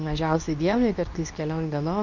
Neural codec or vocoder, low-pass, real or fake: codec, 24 kHz, 0.9 kbps, WavTokenizer, medium speech release version 2; 7.2 kHz; fake